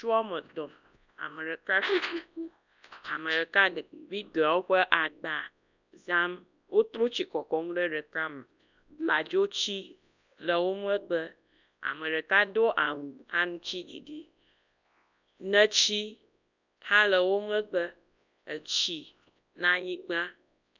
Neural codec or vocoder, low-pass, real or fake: codec, 24 kHz, 0.9 kbps, WavTokenizer, large speech release; 7.2 kHz; fake